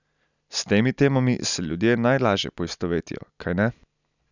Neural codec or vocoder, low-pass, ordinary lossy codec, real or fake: none; 7.2 kHz; none; real